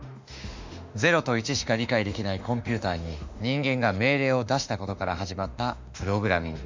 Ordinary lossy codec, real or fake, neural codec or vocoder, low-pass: MP3, 64 kbps; fake; autoencoder, 48 kHz, 32 numbers a frame, DAC-VAE, trained on Japanese speech; 7.2 kHz